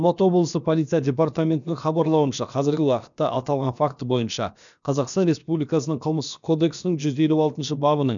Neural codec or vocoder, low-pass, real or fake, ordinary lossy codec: codec, 16 kHz, about 1 kbps, DyCAST, with the encoder's durations; 7.2 kHz; fake; none